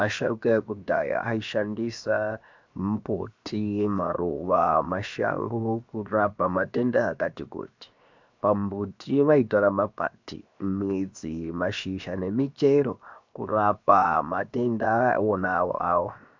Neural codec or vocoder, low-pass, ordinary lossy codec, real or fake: codec, 16 kHz, 0.7 kbps, FocalCodec; 7.2 kHz; MP3, 64 kbps; fake